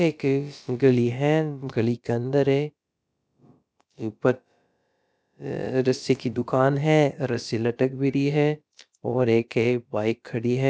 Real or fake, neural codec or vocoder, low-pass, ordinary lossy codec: fake; codec, 16 kHz, about 1 kbps, DyCAST, with the encoder's durations; none; none